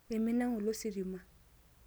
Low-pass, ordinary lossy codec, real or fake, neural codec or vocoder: none; none; real; none